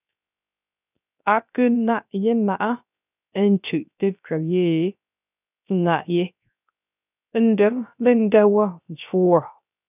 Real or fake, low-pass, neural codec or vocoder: fake; 3.6 kHz; codec, 16 kHz, 0.3 kbps, FocalCodec